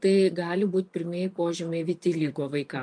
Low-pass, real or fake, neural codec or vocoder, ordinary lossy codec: 9.9 kHz; fake; vocoder, 24 kHz, 100 mel bands, Vocos; MP3, 64 kbps